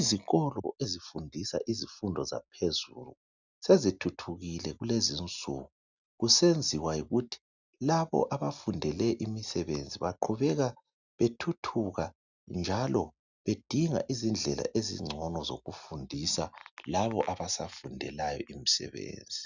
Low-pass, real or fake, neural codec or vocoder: 7.2 kHz; real; none